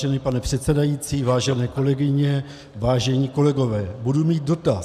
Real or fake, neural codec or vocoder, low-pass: real; none; 14.4 kHz